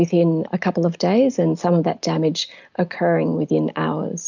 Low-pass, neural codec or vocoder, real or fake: 7.2 kHz; none; real